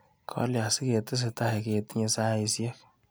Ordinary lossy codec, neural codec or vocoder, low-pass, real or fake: none; none; none; real